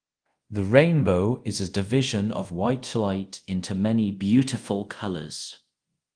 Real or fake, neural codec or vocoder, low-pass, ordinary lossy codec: fake; codec, 24 kHz, 0.5 kbps, DualCodec; 9.9 kHz; Opus, 24 kbps